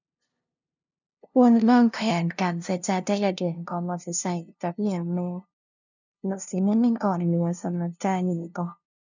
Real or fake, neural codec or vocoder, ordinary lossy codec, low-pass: fake; codec, 16 kHz, 0.5 kbps, FunCodec, trained on LibriTTS, 25 frames a second; none; 7.2 kHz